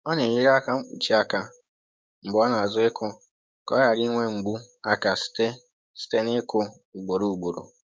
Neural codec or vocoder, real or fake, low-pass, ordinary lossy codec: codec, 16 kHz, 6 kbps, DAC; fake; none; none